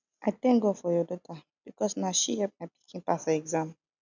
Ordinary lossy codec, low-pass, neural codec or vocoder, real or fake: none; 7.2 kHz; none; real